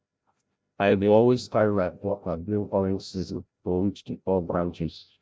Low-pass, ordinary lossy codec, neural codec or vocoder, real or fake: none; none; codec, 16 kHz, 0.5 kbps, FreqCodec, larger model; fake